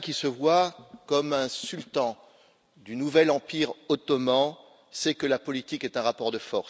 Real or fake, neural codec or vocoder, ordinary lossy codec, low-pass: real; none; none; none